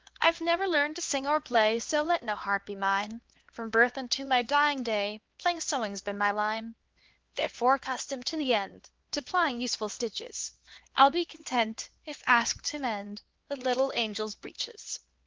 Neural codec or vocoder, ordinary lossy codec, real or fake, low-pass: codec, 16 kHz, 2 kbps, X-Codec, HuBERT features, trained on LibriSpeech; Opus, 16 kbps; fake; 7.2 kHz